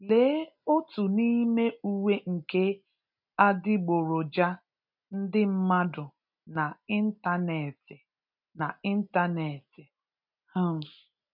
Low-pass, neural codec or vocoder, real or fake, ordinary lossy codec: 5.4 kHz; none; real; none